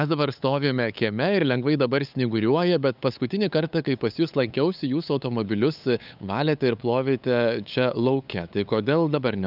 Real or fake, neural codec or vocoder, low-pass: fake; codec, 16 kHz, 8 kbps, FunCodec, trained on LibriTTS, 25 frames a second; 5.4 kHz